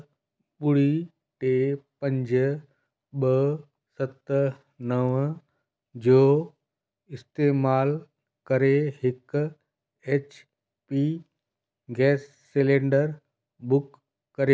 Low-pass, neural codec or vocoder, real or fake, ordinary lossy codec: none; none; real; none